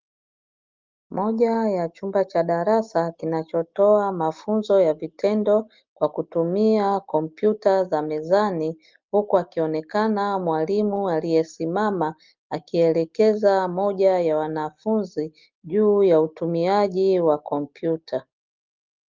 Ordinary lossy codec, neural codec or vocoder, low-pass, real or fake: Opus, 32 kbps; none; 7.2 kHz; real